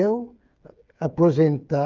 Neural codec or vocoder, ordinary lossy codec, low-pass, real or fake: codec, 16 kHz, 8 kbps, FreqCodec, smaller model; Opus, 24 kbps; 7.2 kHz; fake